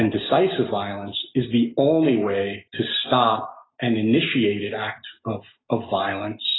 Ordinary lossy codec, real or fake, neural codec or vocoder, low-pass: AAC, 16 kbps; real; none; 7.2 kHz